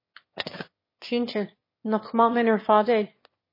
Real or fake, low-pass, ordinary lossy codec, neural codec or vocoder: fake; 5.4 kHz; MP3, 24 kbps; autoencoder, 22.05 kHz, a latent of 192 numbers a frame, VITS, trained on one speaker